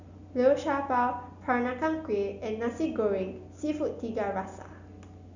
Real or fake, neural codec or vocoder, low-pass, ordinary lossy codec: real; none; 7.2 kHz; none